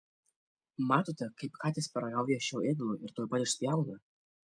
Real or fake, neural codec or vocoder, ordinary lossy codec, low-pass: real; none; MP3, 96 kbps; 9.9 kHz